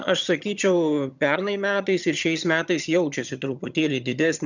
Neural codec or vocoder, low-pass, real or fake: vocoder, 22.05 kHz, 80 mel bands, HiFi-GAN; 7.2 kHz; fake